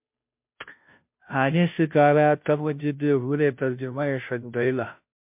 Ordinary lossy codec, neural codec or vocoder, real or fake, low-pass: MP3, 32 kbps; codec, 16 kHz, 0.5 kbps, FunCodec, trained on Chinese and English, 25 frames a second; fake; 3.6 kHz